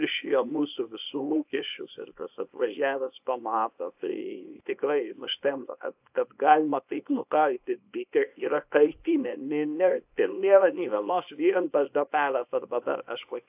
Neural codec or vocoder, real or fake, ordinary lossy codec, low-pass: codec, 24 kHz, 0.9 kbps, WavTokenizer, small release; fake; AAC, 32 kbps; 3.6 kHz